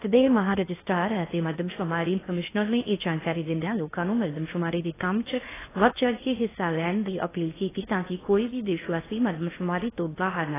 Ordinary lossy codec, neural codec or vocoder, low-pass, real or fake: AAC, 16 kbps; codec, 16 kHz in and 24 kHz out, 0.6 kbps, FocalCodec, streaming, 4096 codes; 3.6 kHz; fake